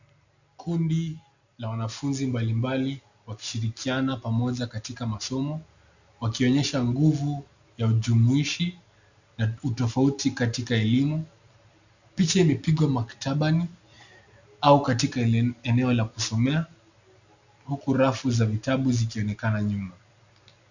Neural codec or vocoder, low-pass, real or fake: none; 7.2 kHz; real